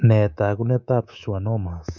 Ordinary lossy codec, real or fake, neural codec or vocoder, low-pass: none; fake; codec, 24 kHz, 3.1 kbps, DualCodec; 7.2 kHz